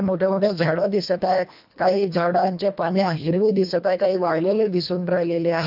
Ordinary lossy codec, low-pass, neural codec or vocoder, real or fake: AAC, 48 kbps; 5.4 kHz; codec, 24 kHz, 1.5 kbps, HILCodec; fake